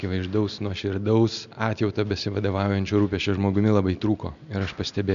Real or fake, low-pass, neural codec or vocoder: real; 7.2 kHz; none